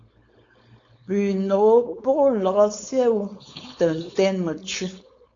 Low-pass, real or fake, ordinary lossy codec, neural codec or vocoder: 7.2 kHz; fake; AAC, 48 kbps; codec, 16 kHz, 4.8 kbps, FACodec